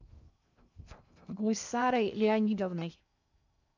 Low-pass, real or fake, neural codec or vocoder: 7.2 kHz; fake; codec, 16 kHz in and 24 kHz out, 0.6 kbps, FocalCodec, streaming, 2048 codes